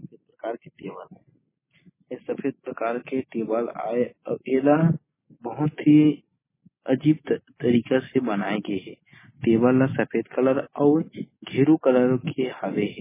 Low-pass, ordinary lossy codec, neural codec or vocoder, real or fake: 3.6 kHz; MP3, 16 kbps; none; real